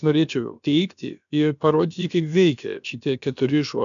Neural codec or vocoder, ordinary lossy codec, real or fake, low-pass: codec, 16 kHz, about 1 kbps, DyCAST, with the encoder's durations; MP3, 96 kbps; fake; 7.2 kHz